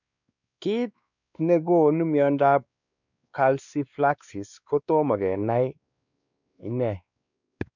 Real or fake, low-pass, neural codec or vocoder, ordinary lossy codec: fake; 7.2 kHz; codec, 16 kHz, 2 kbps, X-Codec, WavLM features, trained on Multilingual LibriSpeech; none